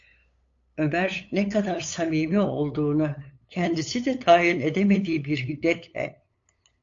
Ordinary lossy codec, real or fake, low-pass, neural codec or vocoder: AAC, 48 kbps; fake; 7.2 kHz; codec, 16 kHz, 8 kbps, FunCodec, trained on LibriTTS, 25 frames a second